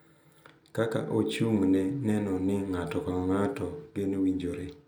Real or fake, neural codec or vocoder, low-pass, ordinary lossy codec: real; none; none; none